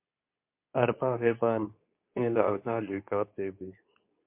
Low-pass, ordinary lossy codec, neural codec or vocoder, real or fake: 3.6 kHz; MP3, 32 kbps; codec, 24 kHz, 0.9 kbps, WavTokenizer, medium speech release version 2; fake